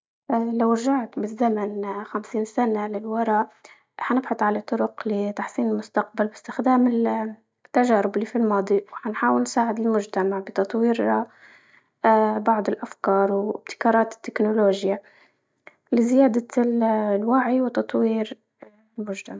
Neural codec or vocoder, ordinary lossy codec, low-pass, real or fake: none; none; none; real